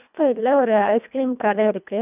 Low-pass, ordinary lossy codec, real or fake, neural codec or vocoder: 3.6 kHz; none; fake; codec, 24 kHz, 1.5 kbps, HILCodec